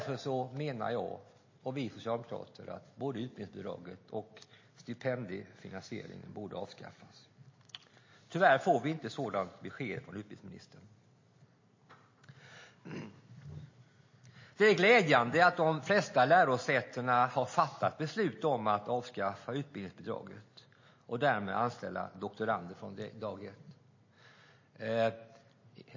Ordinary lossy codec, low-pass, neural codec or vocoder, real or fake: MP3, 32 kbps; 7.2 kHz; none; real